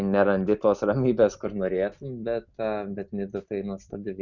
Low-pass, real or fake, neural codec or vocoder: 7.2 kHz; real; none